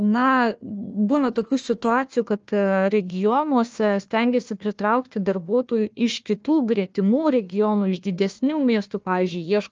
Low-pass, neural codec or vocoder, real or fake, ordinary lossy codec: 7.2 kHz; codec, 16 kHz, 1 kbps, FunCodec, trained on Chinese and English, 50 frames a second; fake; Opus, 24 kbps